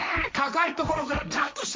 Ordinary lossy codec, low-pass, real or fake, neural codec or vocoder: none; none; fake; codec, 16 kHz, 1.1 kbps, Voila-Tokenizer